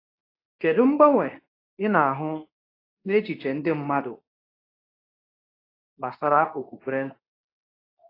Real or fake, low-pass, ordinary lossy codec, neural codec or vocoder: fake; 5.4 kHz; AAC, 24 kbps; codec, 24 kHz, 0.9 kbps, WavTokenizer, medium speech release version 2